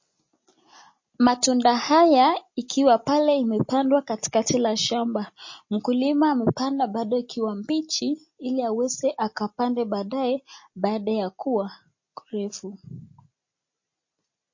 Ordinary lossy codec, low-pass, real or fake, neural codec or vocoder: MP3, 32 kbps; 7.2 kHz; real; none